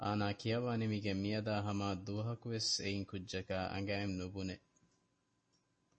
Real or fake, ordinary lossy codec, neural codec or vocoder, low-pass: real; MP3, 32 kbps; none; 9.9 kHz